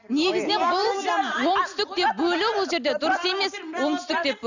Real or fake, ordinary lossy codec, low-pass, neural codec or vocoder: real; none; 7.2 kHz; none